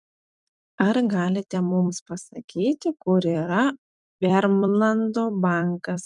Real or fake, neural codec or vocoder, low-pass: fake; vocoder, 44.1 kHz, 128 mel bands every 256 samples, BigVGAN v2; 10.8 kHz